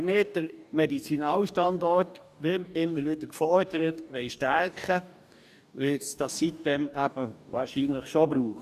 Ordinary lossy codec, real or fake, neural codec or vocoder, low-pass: none; fake; codec, 44.1 kHz, 2.6 kbps, DAC; 14.4 kHz